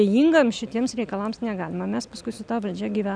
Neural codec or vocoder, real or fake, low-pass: none; real; 9.9 kHz